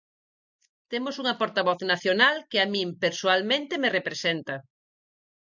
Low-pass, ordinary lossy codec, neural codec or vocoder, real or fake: 7.2 kHz; MP3, 64 kbps; none; real